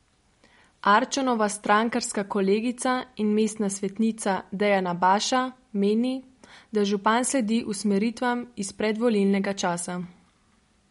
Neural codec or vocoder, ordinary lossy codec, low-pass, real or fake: none; MP3, 48 kbps; 10.8 kHz; real